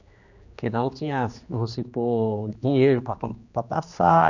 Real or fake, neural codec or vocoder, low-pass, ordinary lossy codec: fake; codec, 16 kHz, 2 kbps, X-Codec, HuBERT features, trained on general audio; 7.2 kHz; none